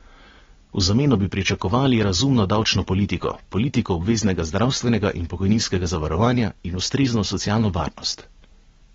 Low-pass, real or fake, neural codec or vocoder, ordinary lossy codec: 7.2 kHz; real; none; AAC, 24 kbps